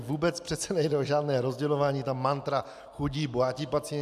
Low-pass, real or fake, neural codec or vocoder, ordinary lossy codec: 14.4 kHz; real; none; AAC, 96 kbps